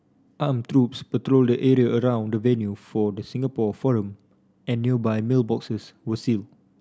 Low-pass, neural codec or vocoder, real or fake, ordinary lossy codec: none; none; real; none